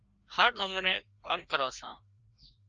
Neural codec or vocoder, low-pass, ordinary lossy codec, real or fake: codec, 16 kHz, 1 kbps, FreqCodec, larger model; 7.2 kHz; Opus, 24 kbps; fake